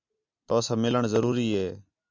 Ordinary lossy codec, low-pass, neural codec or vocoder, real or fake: MP3, 64 kbps; 7.2 kHz; none; real